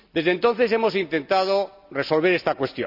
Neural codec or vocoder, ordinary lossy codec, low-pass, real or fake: none; none; 5.4 kHz; real